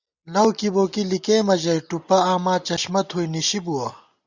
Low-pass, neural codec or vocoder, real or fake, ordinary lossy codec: 7.2 kHz; none; real; Opus, 64 kbps